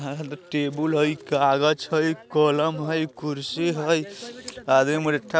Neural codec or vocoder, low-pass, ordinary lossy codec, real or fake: none; none; none; real